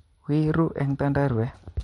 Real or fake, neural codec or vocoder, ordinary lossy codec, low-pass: real; none; MP3, 48 kbps; 19.8 kHz